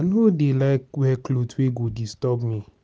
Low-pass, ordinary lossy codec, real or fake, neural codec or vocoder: none; none; real; none